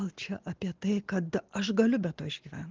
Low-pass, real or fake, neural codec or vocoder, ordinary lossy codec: 7.2 kHz; real; none; Opus, 16 kbps